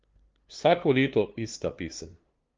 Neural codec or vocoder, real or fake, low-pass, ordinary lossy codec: codec, 16 kHz, 2 kbps, FunCodec, trained on LibriTTS, 25 frames a second; fake; 7.2 kHz; Opus, 32 kbps